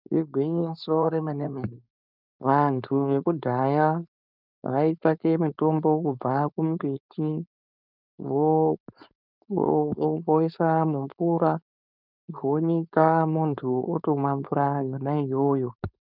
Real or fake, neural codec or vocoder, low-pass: fake; codec, 16 kHz, 4.8 kbps, FACodec; 5.4 kHz